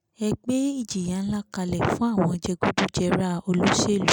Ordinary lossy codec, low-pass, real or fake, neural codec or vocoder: none; 19.8 kHz; real; none